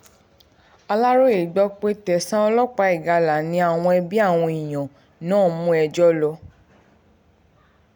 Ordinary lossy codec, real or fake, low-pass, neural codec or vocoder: none; real; 19.8 kHz; none